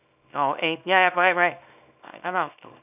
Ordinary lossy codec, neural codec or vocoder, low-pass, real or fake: none; codec, 24 kHz, 0.9 kbps, WavTokenizer, small release; 3.6 kHz; fake